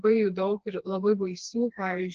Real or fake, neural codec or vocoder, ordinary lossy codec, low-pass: fake; codec, 16 kHz, 2 kbps, FreqCodec, smaller model; Opus, 16 kbps; 7.2 kHz